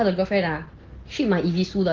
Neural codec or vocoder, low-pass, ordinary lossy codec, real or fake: none; 7.2 kHz; Opus, 16 kbps; real